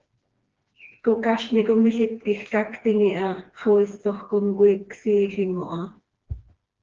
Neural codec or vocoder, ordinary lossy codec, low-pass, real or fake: codec, 16 kHz, 2 kbps, FreqCodec, smaller model; Opus, 16 kbps; 7.2 kHz; fake